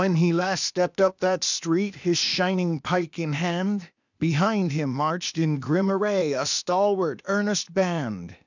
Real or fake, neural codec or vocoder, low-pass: fake; codec, 16 kHz, 0.8 kbps, ZipCodec; 7.2 kHz